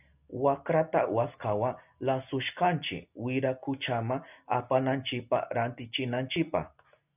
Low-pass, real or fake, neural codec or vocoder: 3.6 kHz; real; none